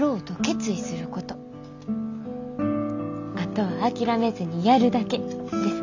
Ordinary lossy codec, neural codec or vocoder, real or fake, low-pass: none; none; real; 7.2 kHz